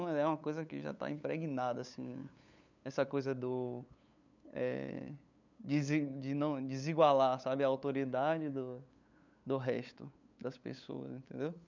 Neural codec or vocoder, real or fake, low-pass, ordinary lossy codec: codec, 16 kHz, 8 kbps, FunCodec, trained on LibriTTS, 25 frames a second; fake; 7.2 kHz; none